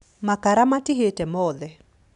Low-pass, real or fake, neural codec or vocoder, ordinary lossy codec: 10.8 kHz; real; none; none